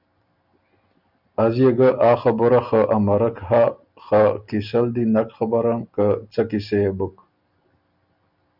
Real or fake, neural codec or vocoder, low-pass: real; none; 5.4 kHz